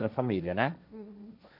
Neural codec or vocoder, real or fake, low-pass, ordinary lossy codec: codec, 16 kHz, 1.1 kbps, Voila-Tokenizer; fake; 5.4 kHz; none